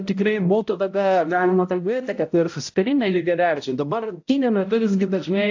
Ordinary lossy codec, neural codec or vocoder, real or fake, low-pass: AAC, 48 kbps; codec, 16 kHz, 0.5 kbps, X-Codec, HuBERT features, trained on balanced general audio; fake; 7.2 kHz